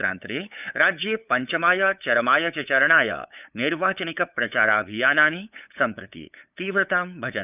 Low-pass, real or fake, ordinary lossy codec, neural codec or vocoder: 3.6 kHz; fake; none; codec, 16 kHz, 8 kbps, FunCodec, trained on LibriTTS, 25 frames a second